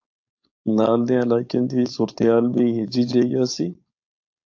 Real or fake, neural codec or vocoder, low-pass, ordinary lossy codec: fake; codec, 16 kHz, 4.8 kbps, FACodec; 7.2 kHz; AAC, 48 kbps